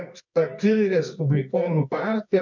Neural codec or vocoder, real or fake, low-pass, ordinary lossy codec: codec, 24 kHz, 0.9 kbps, WavTokenizer, medium music audio release; fake; 7.2 kHz; MP3, 64 kbps